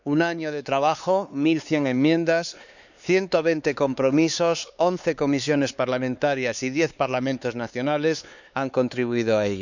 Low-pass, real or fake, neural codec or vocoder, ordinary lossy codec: 7.2 kHz; fake; codec, 16 kHz, 4 kbps, X-Codec, HuBERT features, trained on LibriSpeech; none